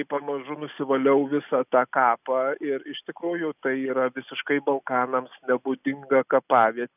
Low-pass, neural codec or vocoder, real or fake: 3.6 kHz; none; real